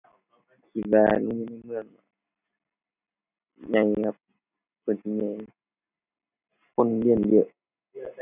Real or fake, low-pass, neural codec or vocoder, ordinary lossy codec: real; 3.6 kHz; none; none